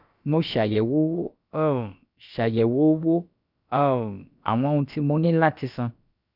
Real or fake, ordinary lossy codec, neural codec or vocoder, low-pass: fake; none; codec, 16 kHz, about 1 kbps, DyCAST, with the encoder's durations; 5.4 kHz